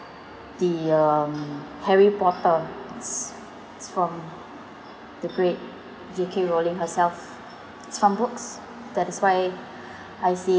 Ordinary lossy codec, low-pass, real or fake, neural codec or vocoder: none; none; real; none